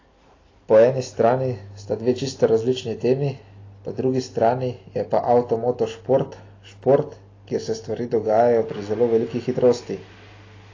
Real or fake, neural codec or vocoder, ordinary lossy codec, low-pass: real; none; AAC, 32 kbps; 7.2 kHz